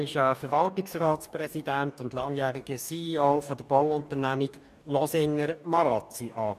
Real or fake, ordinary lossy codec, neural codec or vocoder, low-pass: fake; none; codec, 44.1 kHz, 2.6 kbps, DAC; 14.4 kHz